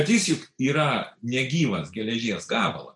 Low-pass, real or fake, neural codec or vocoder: 10.8 kHz; real; none